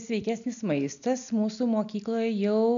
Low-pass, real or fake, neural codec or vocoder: 7.2 kHz; real; none